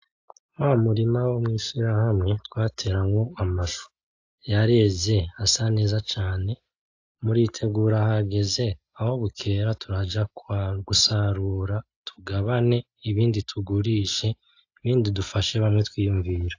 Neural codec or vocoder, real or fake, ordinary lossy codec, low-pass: none; real; AAC, 48 kbps; 7.2 kHz